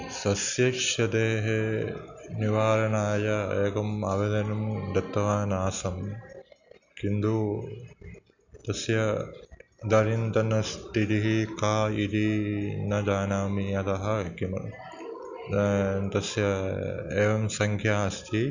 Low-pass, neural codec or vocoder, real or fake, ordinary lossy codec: 7.2 kHz; none; real; none